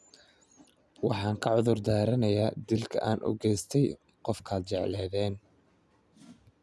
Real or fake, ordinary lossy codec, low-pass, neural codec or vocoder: real; none; none; none